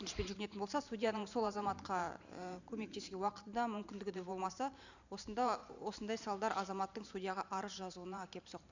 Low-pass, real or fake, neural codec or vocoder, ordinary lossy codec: 7.2 kHz; fake; vocoder, 44.1 kHz, 128 mel bands every 512 samples, BigVGAN v2; none